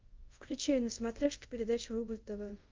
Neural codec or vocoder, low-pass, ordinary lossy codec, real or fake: codec, 24 kHz, 0.5 kbps, DualCodec; 7.2 kHz; Opus, 32 kbps; fake